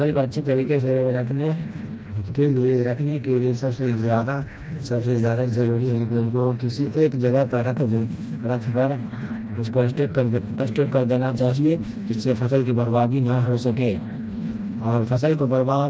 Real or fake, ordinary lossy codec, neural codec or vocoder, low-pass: fake; none; codec, 16 kHz, 1 kbps, FreqCodec, smaller model; none